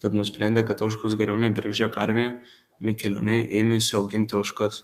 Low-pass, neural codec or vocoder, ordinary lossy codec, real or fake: 14.4 kHz; codec, 32 kHz, 1.9 kbps, SNAC; Opus, 64 kbps; fake